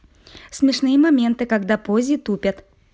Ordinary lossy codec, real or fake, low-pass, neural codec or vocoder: none; real; none; none